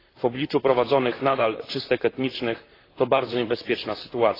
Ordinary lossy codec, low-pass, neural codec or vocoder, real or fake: AAC, 24 kbps; 5.4 kHz; vocoder, 44.1 kHz, 128 mel bands, Pupu-Vocoder; fake